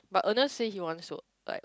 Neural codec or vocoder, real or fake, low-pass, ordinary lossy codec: none; real; none; none